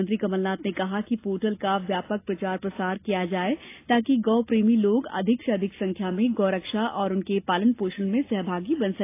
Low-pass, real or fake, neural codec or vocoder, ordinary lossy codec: 3.6 kHz; real; none; AAC, 24 kbps